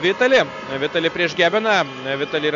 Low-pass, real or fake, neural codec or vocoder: 7.2 kHz; real; none